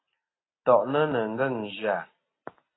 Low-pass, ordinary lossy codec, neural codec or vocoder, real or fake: 7.2 kHz; AAC, 16 kbps; none; real